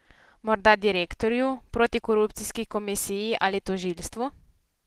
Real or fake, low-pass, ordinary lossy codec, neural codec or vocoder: real; 14.4 kHz; Opus, 16 kbps; none